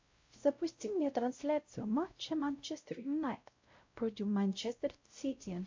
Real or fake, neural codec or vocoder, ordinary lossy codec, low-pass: fake; codec, 16 kHz, 0.5 kbps, X-Codec, WavLM features, trained on Multilingual LibriSpeech; MP3, 48 kbps; 7.2 kHz